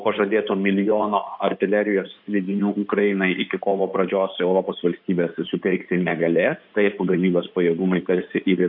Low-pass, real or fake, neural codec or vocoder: 5.4 kHz; fake; codec, 16 kHz in and 24 kHz out, 2.2 kbps, FireRedTTS-2 codec